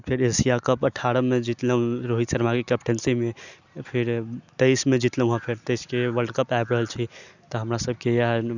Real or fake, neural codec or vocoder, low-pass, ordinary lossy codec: real; none; 7.2 kHz; none